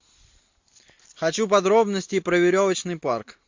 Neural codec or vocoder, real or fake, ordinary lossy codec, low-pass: none; real; MP3, 48 kbps; 7.2 kHz